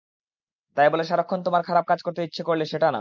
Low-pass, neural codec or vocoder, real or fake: 7.2 kHz; none; real